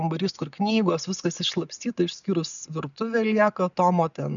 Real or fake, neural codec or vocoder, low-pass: real; none; 7.2 kHz